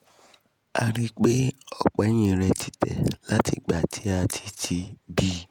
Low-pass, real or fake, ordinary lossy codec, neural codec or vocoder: 19.8 kHz; fake; none; vocoder, 48 kHz, 128 mel bands, Vocos